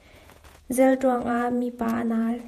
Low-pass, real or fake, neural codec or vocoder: 14.4 kHz; fake; vocoder, 48 kHz, 128 mel bands, Vocos